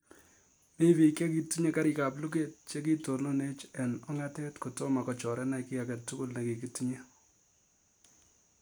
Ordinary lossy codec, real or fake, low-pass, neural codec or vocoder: none; real; none; none